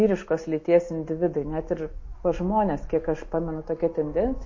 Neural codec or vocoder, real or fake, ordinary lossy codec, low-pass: none; real; MP3, 32 kbps; 7.2 kHz